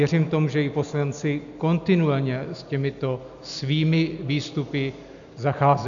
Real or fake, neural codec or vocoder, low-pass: real; none; 7.2 kHz